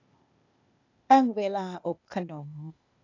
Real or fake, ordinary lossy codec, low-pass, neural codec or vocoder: fake; none; 7.2 kHz; codec, 16 kHz, 0.8 kbps, ZipCodec